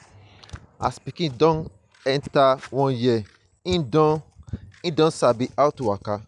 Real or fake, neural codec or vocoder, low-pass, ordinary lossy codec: real; none; 10.8 kHz; none